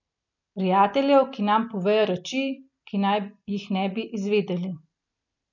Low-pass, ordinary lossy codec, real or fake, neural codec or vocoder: 7.2 kHz; none; real; none